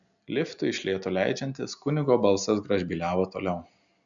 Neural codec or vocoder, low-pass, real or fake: none; 7.2 kHz; real